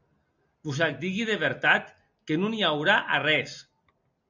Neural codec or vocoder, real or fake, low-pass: none; real; 7.2 kHz